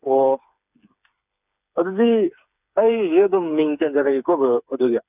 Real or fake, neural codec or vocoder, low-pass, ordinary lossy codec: fake; codec, 16 kHz, 4 kbps, FreqCodec, smaller model; 3.6 kHz; none